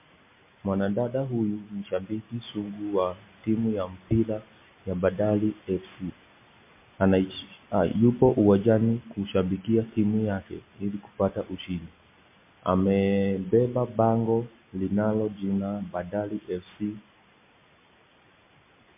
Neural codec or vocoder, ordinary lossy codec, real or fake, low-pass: none; MP3, 24 kbps; real; 3.6 kHz